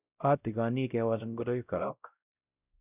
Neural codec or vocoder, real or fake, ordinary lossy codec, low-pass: codec, 16 kHz, 0.5 kbps, X-Codec, WavLM features, trained on Multilingual LibriSpeech; fake; none; 3.6 kHz